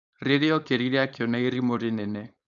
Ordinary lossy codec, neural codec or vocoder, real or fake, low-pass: none; codec, 16 kHz, 4.8 kbps, FACodec; fake; 7.2 kHz